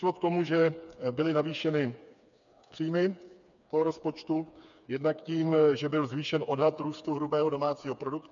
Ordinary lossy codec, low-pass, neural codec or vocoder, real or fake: MP3, 96 kbps; 7.2 kHz; codec, 16 kHz, 4 kbps, FreqCodec, smaller model; fake